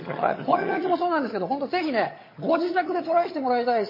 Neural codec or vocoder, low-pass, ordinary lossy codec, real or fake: vocoder, 22.05 kHz, 80 mel bands, HiFi-GAN; 5.4 kHz; MP3, 24 kbps; fake